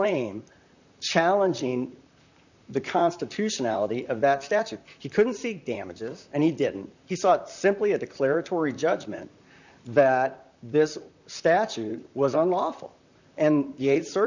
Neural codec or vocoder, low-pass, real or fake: vocoder, 44.1 kHz, 128 mel bands, Pupu-Vocoder; 7.2 kHz; fake